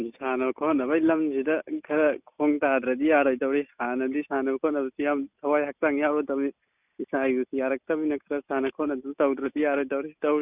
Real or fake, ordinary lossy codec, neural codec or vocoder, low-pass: real; none; none; 3.6 kHz